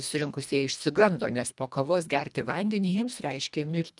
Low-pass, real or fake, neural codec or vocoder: 10.8 kHz; fake; codec, 24 kHz, 1.5 kbps, HILCodec